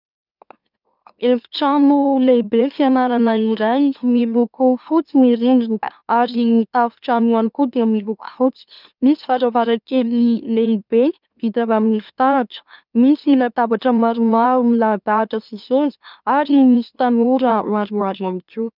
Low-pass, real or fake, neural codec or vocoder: 5.4 kHz; fake; autoencoder, 44.1 kHz, a latent of 192 numbers a frame, MeloTTS